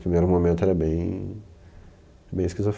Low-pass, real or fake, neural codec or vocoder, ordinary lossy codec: none; real; none; none